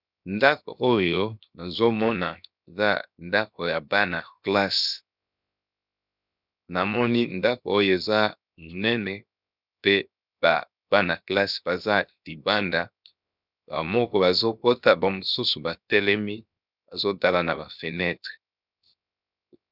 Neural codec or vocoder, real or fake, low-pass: codec, 16 kHz, 0.7 kbps, FocalCodec; fake; 5.4 kHz